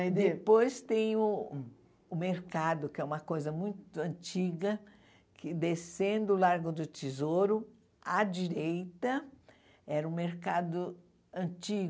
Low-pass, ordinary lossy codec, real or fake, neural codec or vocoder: none; none; real; none